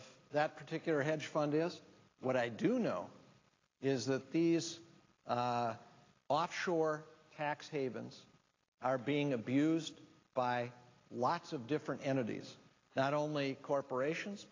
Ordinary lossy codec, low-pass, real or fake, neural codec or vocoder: AAC, 32 kbps; 7.2 kHz; real; none